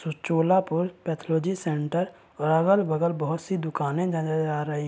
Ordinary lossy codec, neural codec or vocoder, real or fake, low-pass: none; none; real; none